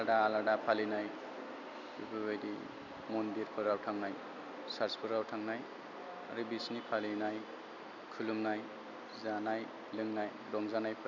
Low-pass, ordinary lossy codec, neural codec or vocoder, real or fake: 7.2 kHz; none; none; real